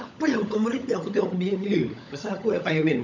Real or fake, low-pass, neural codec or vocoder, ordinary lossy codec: fake; 7.2 kHz; codec, 16 kHz, 16 kbps, FunCodec, trained on LibriTTS, 50 frames a second; none